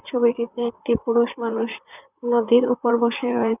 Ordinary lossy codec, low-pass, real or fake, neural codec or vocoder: none; 3.6 kHz; fake; vocoder, 44.1 kHz, 128 mel bands, Pupu-Vocoder